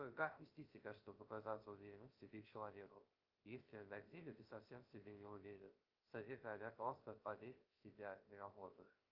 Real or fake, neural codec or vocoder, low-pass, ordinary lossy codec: fake; codec, 16 kHz, 0.3 kbps, FocalCodec; 5.4 kHz; Opus, 24 kbps